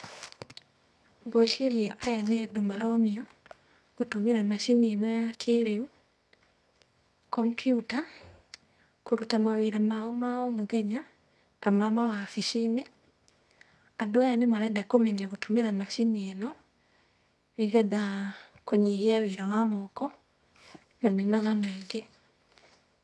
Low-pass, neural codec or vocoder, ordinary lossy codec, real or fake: none; codec, 24 kHz, 0.9 kbps, WavTokenizer, medium music audio release; none; fake